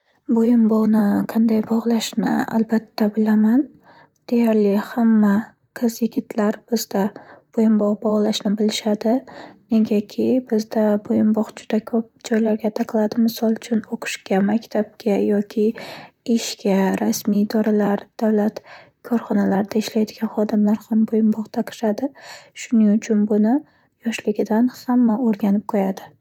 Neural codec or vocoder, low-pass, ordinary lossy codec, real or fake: vocoder, 44.1 kHz, 128 mel bands, Pupu-Vocoder; 19.8 kHz; none; fake